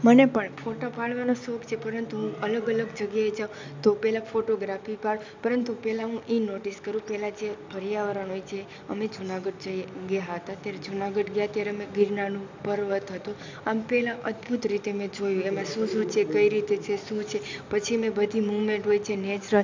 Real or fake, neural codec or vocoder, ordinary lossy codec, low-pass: real; none; MP3, 64 kbps; 7.2 kHz